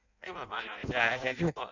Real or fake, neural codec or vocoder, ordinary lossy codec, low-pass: fake; codec, 16 kHz in and 24 kHz out, 0.6 kbps, FireRedTTS-2 codec; none; 7.2 kHz